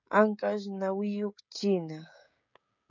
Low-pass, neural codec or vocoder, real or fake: 7.2 kHz; codec, 16 kHz, 16 kbps, FreqCodec, smaller model; fake